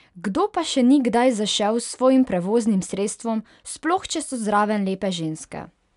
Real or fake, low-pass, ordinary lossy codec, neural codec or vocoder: real; 10.8 kHz; none; none